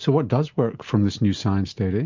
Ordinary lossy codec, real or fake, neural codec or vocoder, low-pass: MP3, 64 kbps; real; none; 7.2 kHz